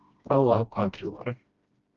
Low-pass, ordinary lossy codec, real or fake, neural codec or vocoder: 7.2 kHz; Opus, 32 kbps; fake; codec, 16 kHz, 1 kbps, FreqCodec, smaller model